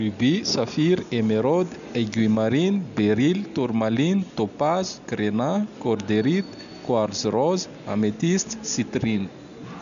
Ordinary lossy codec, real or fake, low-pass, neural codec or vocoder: none; real; 7.2 kHz; none